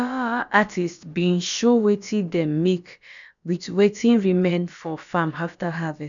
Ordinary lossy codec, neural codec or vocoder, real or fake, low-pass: none; codec, 16 kHz, about 1 kbps, DyCAST, with the encoder's durations; fake; 7.2 kHz